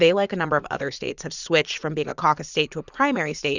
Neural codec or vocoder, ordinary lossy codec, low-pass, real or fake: vocoder, 44.1 kHz, 80 mel bands, Vocos; Opus, 64 kbps; 7.2 kHz; fake